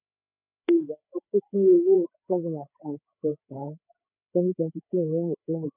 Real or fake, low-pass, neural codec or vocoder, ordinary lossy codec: fake; 3.6 kHz; codec, 16 kHz, 8 kbps, FreqCodec, larger model; none